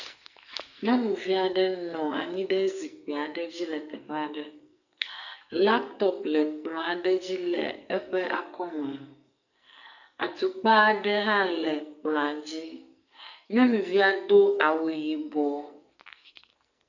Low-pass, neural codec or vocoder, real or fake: 7.2 kHz; codec, 44.1 kHz, 2.6 kbps, SNAC; fake